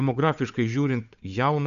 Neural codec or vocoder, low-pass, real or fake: codec, 16 kHz, 8 kbps, FunCodec, trained on Chinese and English, 25 frames a second; 7.2 kHz; fake